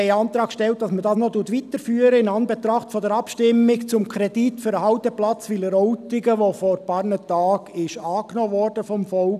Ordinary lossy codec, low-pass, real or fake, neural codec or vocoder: none; 14.4 kHz; real; none